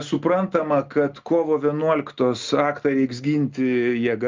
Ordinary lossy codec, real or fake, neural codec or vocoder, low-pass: Opus, 24 kbps; real; none; 7.2 kHz